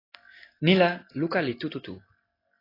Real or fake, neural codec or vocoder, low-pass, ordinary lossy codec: real; none; 5.4 kHz; AAC, 24 kbps